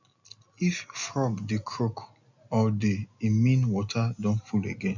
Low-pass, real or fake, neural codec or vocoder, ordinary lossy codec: 7.2 kHz; real; none; none